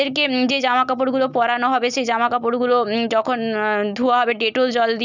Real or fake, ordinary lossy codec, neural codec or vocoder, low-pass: real; none; none; 7.2 kHz